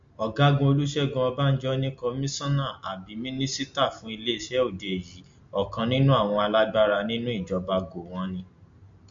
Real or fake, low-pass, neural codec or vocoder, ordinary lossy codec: real; 7.2 kHz; none; MP3, 48 kbps